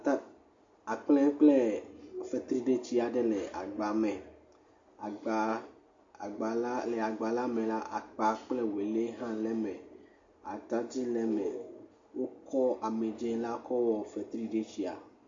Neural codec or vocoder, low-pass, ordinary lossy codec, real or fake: none; 7.2 kHz; MP3, 48 kbps; real